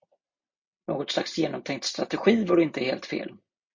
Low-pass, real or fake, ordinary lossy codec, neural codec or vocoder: 7.2 kHz; real; MP3, 48 kbps; none